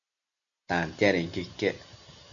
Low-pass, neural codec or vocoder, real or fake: 7.2 kHz; none; real